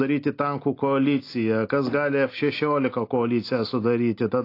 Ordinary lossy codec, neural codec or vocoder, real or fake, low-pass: AAC, 32 kbps; none; real; 5.4 kHz